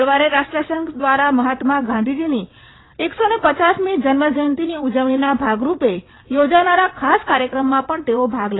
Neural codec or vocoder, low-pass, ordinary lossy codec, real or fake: vocoder, 22.05 kHz, 80 mel bands, WaveNeXt; 7.2 kHz; AAC, 16 kbps; fake